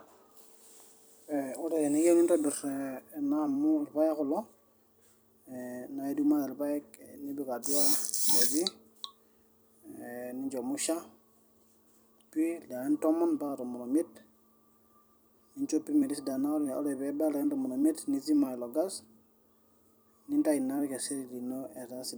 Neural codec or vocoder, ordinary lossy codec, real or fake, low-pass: vocoder, 44.1 kHz, 128 mel bands every 256 samples, BigVGAN v2; none; fake; none